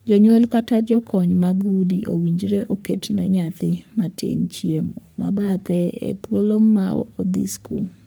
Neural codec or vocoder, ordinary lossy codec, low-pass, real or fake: codec, 44.1 kHz, 3.4 kbps, Pupu-Codec; none; none; fake